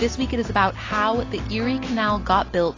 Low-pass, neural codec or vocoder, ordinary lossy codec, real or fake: 7.2 kHz; none; AAC, 32 kbps; real